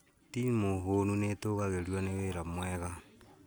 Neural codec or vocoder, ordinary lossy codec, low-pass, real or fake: none; none; none; real